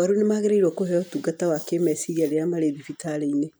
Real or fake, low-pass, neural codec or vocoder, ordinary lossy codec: real; none; none; none